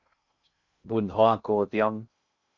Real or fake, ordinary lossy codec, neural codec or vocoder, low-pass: fake; Opus, 64 kbps; codec, 16 kHz in and 24 kHz out, 0.6 kbps, FocalCodec, streaming, 2048 codes; 7.2 kHz